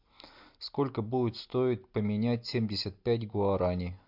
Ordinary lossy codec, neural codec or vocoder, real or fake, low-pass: MP3, 48 kbps; none; real; 5.4 kHz